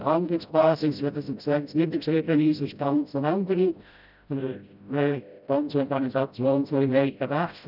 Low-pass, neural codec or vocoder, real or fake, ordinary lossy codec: 5.4 kHz; codec, 16 kHz, 0.5 kbps, FreqCodec, smaller model; fake; none